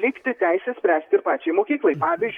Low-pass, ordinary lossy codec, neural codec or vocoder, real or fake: 19.8 kHz; MP3, 96 kbps; vocoder, 44.1 kHz, 128 mel bands, Pupu-Vocoder; fake